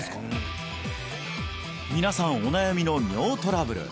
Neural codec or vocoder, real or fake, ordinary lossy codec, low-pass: none; real; none; none